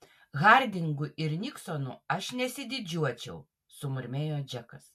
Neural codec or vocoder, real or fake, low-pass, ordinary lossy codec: none; real; 14.4 kHz; MP3, 64 kbps